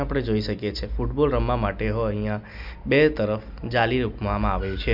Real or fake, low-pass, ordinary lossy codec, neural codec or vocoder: real; 5.4 kHz; none; none